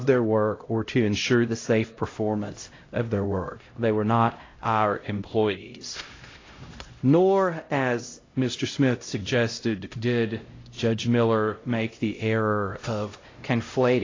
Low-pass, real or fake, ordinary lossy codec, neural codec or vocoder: 7.2 kHz; fake; AAC, 32 kbps; codec, 16 kHz, 0.5 kbps, X-Codec, HuBERT features, trained on LibriSpeech